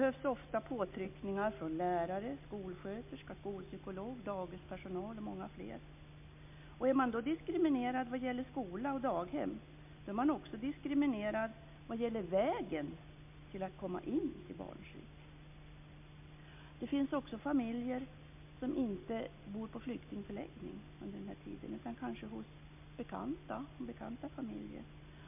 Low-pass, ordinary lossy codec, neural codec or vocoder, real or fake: 3.6 kHz; MP3, 32 kbps; none; real